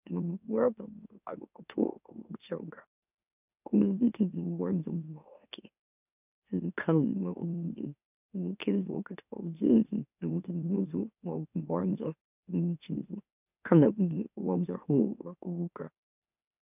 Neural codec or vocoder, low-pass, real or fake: autoencoder, 44.1 kHz, a latent of 192 numbers a frame, MeloTTS; 3.6 kHz; fake